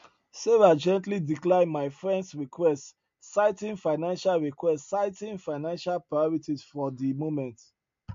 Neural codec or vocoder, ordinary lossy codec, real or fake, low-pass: none; MP3, 48 kbps; real; 7.2 kHz